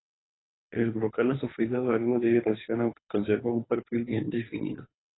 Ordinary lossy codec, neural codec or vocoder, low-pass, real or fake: AAC, 16 kbps; codec, 24 kHz, 3 kbps, HILCodec; 7.2 kHz; fake